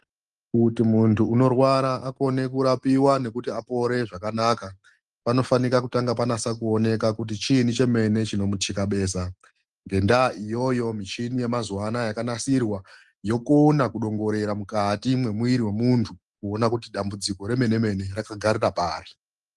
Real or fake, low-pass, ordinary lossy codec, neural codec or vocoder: real; 10.8 kHz; Opus, 24 kbps; none